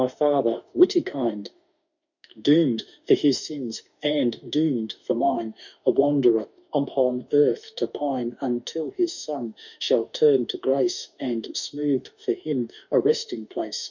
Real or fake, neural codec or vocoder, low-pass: fake; autoencoder, 48 kHz, 32 numbers a frame, DAC-VAE, trained on Japanese speech; 7.2 kHz